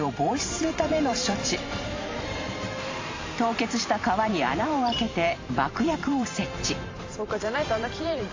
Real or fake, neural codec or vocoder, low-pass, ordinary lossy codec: fake; vocoder, 44.1 kHz, 128 mel bands every 512 samples, BigVGAN v2; 7.2 kHz; AAC, 32 kbps